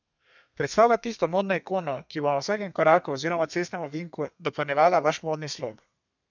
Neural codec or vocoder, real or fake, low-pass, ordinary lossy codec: codec, 32 kHz, 1.9 kbps, SNAC; fake; 7.2 kHz; none